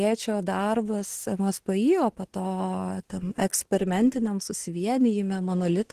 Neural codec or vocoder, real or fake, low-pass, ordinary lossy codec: autoencoder, 48 kHz, 32 numbers a frame, DAC-VAE, trained on Japanese speech; fake; 14.4 kHz; Opus, 16 kbps